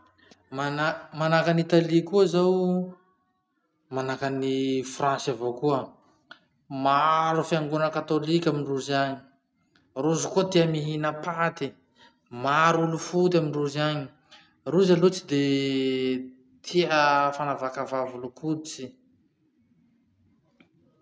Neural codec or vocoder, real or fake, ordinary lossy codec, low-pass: none; real; none; none